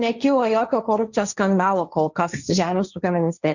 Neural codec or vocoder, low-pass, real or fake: codec, 16 kHz, 1.1 kbps, Voila-Tokenizer; 7.2 kHz; fake